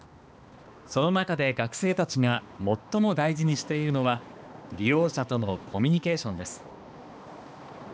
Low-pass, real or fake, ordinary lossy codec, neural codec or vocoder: none; fake; none; codec, 16 kHz, 2 kbps, X-Codec, HuBERT features, trained on balanced general audio